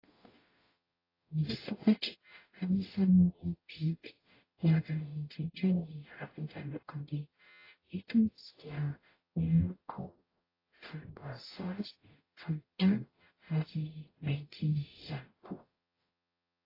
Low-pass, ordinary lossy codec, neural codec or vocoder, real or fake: 5.4 kHz; AAC, 24 kbps; codec, 44.1 kHz, 0.9 kbps, DAC; fake